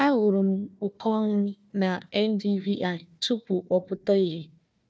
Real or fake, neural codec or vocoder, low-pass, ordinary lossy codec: fake; codec, 16 kHz, 1 kbps, FunCodec, trained on Chinese and English, 50 frames a second; none; none